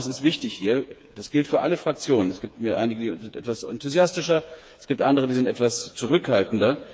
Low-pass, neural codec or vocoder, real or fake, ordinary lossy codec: none; codec, 16 kHz, 4 kbps, FreqCodec, smaller model; fake; none